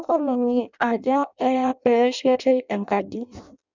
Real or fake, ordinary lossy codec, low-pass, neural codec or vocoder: fake; none; 7.2 kHz; codec, 16 kHz in and 24 kHz out, 0.6 kbps, FireRedTTS-2 codec